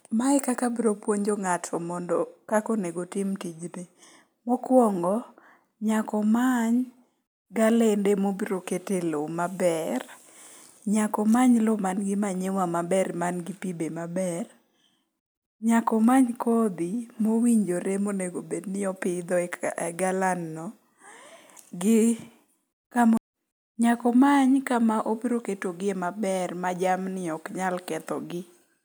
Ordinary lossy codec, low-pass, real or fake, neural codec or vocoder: none; none; real; none